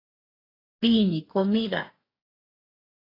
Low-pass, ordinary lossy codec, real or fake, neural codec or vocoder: 5.4 kHz; AAC, 32 kbps; fake; codec, 44.1 kHz, 2.6 kbps, DAC